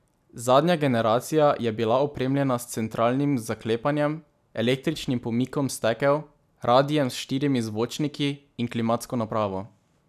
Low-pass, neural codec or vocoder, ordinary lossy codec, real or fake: 14.4 kHz; none; none; real